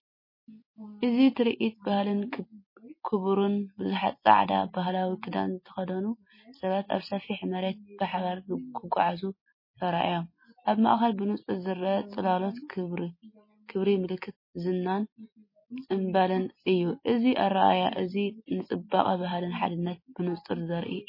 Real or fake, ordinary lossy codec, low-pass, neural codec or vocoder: real; MP3, 24 kbps; 5.4 kHz; none